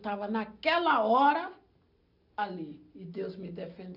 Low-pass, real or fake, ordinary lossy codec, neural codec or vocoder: 5.4 kHz; real; none; none